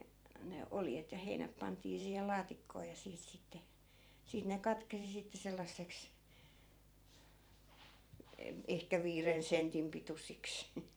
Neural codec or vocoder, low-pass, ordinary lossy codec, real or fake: vocoder, 44.1 kHz, 128 mel bands every 512 samples, BigVGAN v2; none; none; fake